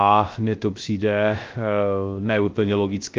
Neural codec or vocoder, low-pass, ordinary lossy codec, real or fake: codec, 16 kHz, 0.3 kbps, FocalCodec; 7.2 kHz; Opus, 24 kbps; fake